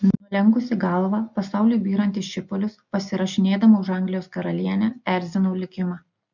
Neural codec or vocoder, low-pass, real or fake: none; 7.2 kHz; real